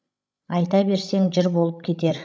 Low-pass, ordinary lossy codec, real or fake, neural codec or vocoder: none; none; fake; codec, 16 kHz, 16 kbps, FreqCodec, larger model